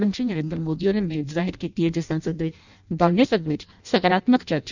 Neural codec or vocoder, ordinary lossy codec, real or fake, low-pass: codec, 16 kHz in and 24 kHz out, 0.6 kbps, FireRedTTS-2 codec; none; fake; 7.2 kHz